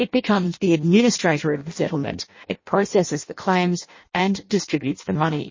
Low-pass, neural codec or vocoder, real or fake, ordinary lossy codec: 7.2 kHz; codec, 16 kHz in and 24 kHz out, 0.6 kbps, FireRedTTS-2 codec; fake; MP3, 32 kbps